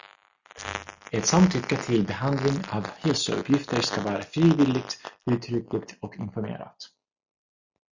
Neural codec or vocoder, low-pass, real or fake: none; 7.2 kHz; real